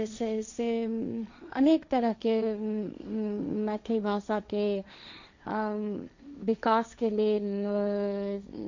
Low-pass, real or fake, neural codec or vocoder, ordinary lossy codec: 7.2 kHz; fake; codec, 16 kHz, 1.1 kbps, Voila-Tokenizer; none